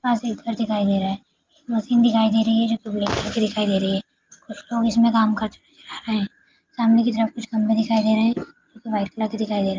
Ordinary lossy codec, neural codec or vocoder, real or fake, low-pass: Opus, 32 kbps; none; real; 7.2 kHz